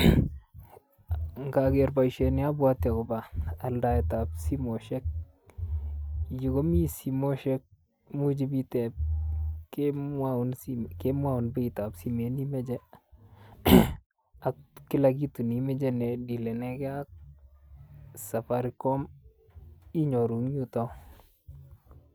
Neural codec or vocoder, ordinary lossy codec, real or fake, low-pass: none; none; real; none